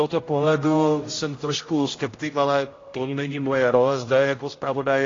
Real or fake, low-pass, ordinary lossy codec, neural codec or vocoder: fake; 7.2 kHz; AAC, 32 kbps; codec, 16 kHz, 0.5 kbps, X-Codec, HuBERT features, trained on general audio